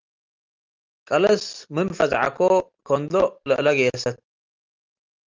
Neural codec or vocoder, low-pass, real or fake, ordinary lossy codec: none; 7.2 kHz; real; Opus, 24 kbps